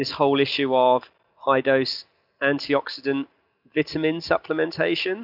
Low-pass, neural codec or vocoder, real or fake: 5.4 kHz; none; real